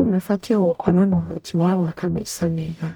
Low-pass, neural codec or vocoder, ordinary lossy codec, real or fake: none; codec, 44.1 kHz, 0.9 kbps, DAC; none; fake